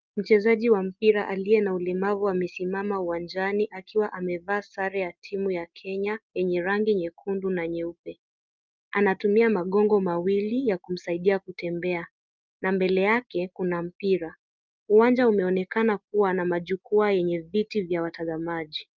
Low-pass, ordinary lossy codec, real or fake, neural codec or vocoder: 7.2 kHz; Opus, 24 kbps; real; none